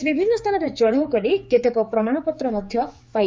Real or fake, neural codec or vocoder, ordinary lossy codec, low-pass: fake; codec, 16 kHz, 4 kbps, X-Codec, HuBERT features, trained on general audio; none; none